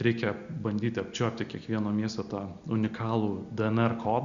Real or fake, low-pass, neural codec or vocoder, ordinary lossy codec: real; 7.2 kHz; none; Opus, 64 kbps